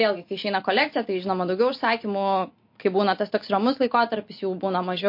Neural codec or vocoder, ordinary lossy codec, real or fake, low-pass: none; MP3, 32 kbps; real; 5.4 kHz